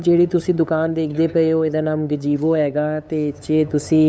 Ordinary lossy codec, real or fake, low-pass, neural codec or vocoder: none; fake; none; codec, 16 kHz, 8 kbps, FunCodec, trained on LibriTTS, 25 frames a second